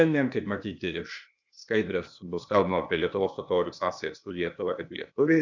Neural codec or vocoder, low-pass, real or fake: codec, 16 kHz, 0.8 kbps, ZipCodec; 7.2 kHz; fake